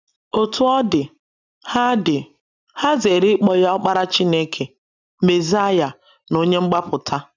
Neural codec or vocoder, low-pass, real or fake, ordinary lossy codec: none; 7.2 kHz; real; none